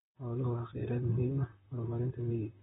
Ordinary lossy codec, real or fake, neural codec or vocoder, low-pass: AAC, 16 kbps; fake; vocoder, 44.1 kHz, 128 mel bands, Pupu-Vocoder; 19.8 kHz